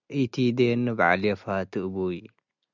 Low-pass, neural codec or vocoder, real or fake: 7.2 kHz; none; real